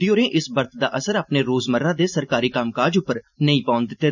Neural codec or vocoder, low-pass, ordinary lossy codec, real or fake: none; 7.2 kHz; none; real